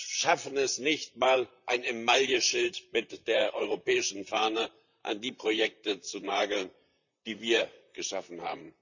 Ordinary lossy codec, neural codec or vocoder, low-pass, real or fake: none; vocoder, 44.1 kHz, 128 mel bands, Pupu-Vocoder; 7.2 kHz; fake